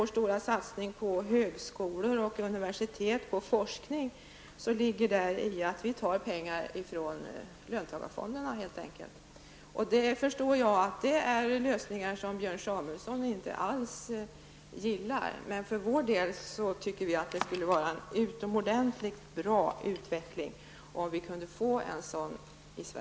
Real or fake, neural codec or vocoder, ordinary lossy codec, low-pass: real; none; none; none